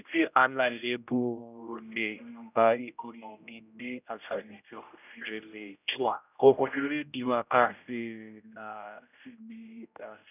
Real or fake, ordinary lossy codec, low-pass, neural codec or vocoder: fake; none; 3.6 kHz; codec, 16 kHz, 0.5 kbps, X-Codec, HuBERT features, trained on general audio